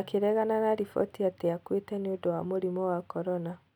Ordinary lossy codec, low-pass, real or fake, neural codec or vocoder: none; 19.8 kHz; real; none